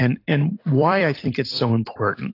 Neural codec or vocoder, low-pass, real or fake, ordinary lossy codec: vocoder, 44.1 kHz, 128 mel bands every 256 samples, BigVGAN v2; 5.4 kHz; fake; AAC, 32 kbps